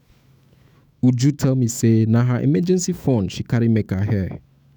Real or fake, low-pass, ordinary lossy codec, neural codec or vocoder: fake; none; none; autoencoder, 48 kHz, 128 numbers a frame, DAC-VAE, trained on Japanese speech